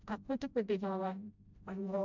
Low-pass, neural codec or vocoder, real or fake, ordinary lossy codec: 7.2 kHz; codec, 16 kHz, 0.5 kbps, FreqCodec, smaller model; fake; none